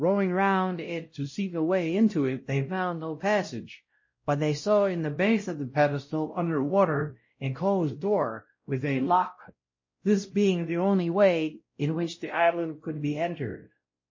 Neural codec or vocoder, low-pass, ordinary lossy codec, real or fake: codec, 16 kHz, 0.5 kbps, X-Codec, WavLM features, trained on Multilingual LibriSpeech; 7.2 kHz; MP3, 32 kbps; fake